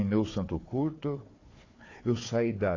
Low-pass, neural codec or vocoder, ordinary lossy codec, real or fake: 7.2 kHz; codec, 16 kHz, 4 kbps, FunCodec, trained on Chinese and English, 50 frames a second; AAC, 32 kbps; fake